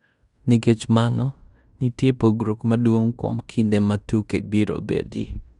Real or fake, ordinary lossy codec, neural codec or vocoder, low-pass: fake; Opus, 64 kbps; codec, 16 kHz in and 24 kHz out, 0.9 kbps, LongCat-Audio-Codec, fine tuned four codebook decoder; 10.8 kHz